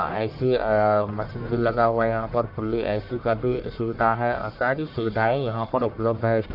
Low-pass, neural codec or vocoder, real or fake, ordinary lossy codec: 5.4 kHz; codec, 44.1 kHz, 1.7 kbps, Pupu-Codec; fake; none